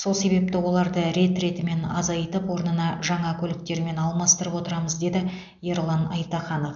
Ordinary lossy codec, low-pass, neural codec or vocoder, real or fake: none; 7.2 kHz; none; real